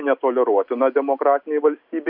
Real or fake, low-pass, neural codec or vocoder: real; 5.4 kHz; none